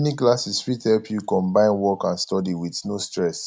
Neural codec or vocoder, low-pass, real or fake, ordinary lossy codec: none; none; real; none